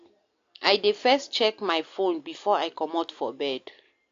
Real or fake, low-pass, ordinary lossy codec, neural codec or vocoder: real; 7.2 kHz; AAC, 48 kbps; none